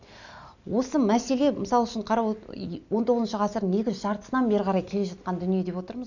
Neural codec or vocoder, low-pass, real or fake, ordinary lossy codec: none; 7.2 kHz; real; none